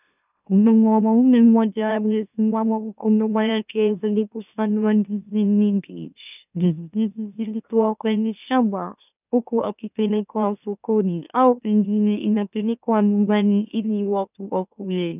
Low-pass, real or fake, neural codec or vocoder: 3.6 kHz; fake; autoencoder, 44.1 kHz, a latent of 192 numbers a frame, MeloTTS